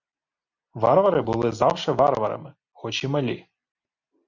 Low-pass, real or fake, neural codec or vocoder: 7.2 kHz; real; none